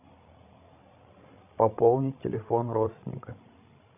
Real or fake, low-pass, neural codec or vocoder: fake; 3.6 kHz; codec, 16 kHz, 16 kbps, FreqCodec, larger model